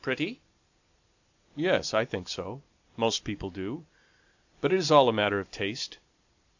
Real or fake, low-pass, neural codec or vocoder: real; 7.2 kHz; none